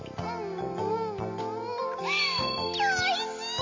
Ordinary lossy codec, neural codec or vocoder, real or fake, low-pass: MP3, 64 kbps; none; real; 7.2 kHz